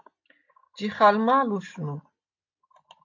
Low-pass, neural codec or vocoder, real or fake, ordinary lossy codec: 7.2 kHz; none; real; AAC, 48 kbps